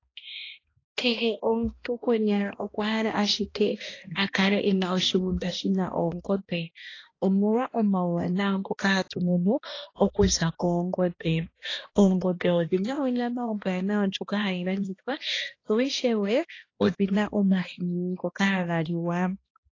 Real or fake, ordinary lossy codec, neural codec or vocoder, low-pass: fake; AAC, 32 kbps; codec, 16 kHz, 1 kbps, X-Codec, HuBERT features, trained on balanced general audio; 7.2 kHz